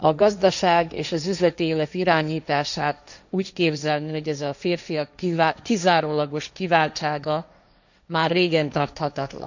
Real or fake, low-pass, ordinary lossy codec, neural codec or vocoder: fake; 7.2 kHz; none; codec, 16 kHz, 1.1 kbps, Voila-Tokenizer